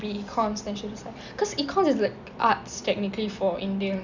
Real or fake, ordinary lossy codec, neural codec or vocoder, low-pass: real; Opus, 64 kbps; none; 7.2 kHz